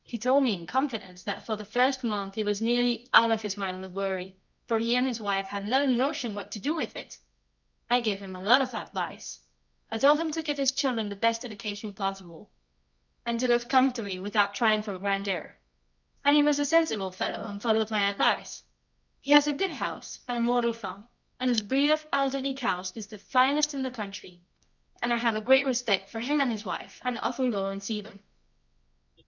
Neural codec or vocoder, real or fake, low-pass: codec, 24 kHz, 0.9 kbps, WavTokenizer, medium music audio release; fake; 7.2 kHz